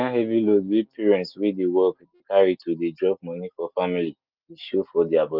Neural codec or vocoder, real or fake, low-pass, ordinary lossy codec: none; real; 5.4 kHz; Opus, 24 kbps